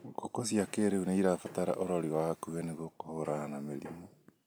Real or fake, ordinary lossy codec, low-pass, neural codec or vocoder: real; none; none; none